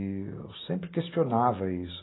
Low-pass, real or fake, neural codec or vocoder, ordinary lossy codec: 7.2 kHz; real; none; AAC, 16 kbps